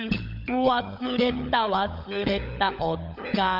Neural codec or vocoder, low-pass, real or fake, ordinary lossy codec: codec, 16 kHz, 16 kbps, FunCodec, trained on LibriTTS, 50 frames a second; 5.4 kHz; fake; none